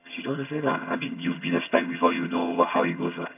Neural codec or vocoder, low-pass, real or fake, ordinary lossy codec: vocoder, 22.05 kHz, 80 mel bands, HiFi-GAN; 3.6 kHz; fake; AAC, 32 kbps